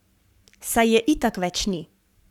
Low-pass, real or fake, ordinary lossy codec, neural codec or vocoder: 19.8 kHz; fake; none; codec, 44.1 kHz, 7.8 kbps, Pupu-Codec